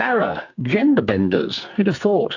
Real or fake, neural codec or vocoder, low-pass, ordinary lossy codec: fake; codec, 44.1 kHz, 2.6 kbps, SNAC; 7.2 kHz; AAC, 48 kbps